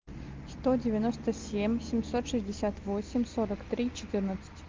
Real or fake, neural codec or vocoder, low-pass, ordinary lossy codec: real; none; 7.2 kHz; Opus, 32 kbps